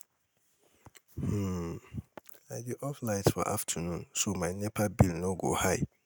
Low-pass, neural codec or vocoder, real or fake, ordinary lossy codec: none; none; real; none